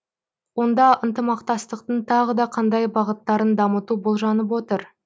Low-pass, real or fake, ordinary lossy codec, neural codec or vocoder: none; real; none; none